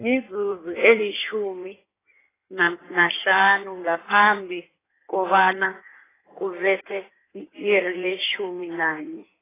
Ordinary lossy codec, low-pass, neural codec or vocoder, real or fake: AAC, 16 kbps; 3.6 kHz; codec, 16 kHz in and 24 kHz out, 1.1 kbps, FireRedTTS-2 codec; fake